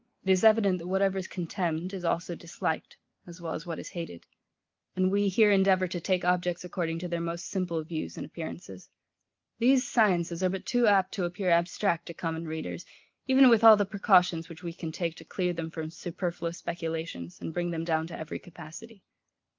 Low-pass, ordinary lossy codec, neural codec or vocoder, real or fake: 7.2 kHz; Opus, 32 kbps; none; real